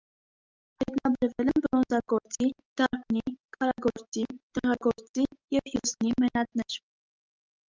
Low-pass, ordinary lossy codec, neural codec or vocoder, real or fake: 7.2 kHz; Opus, 32 kbps; none; real